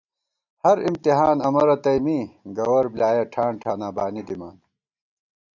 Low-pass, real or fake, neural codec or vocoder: 7.2 kHz; real; none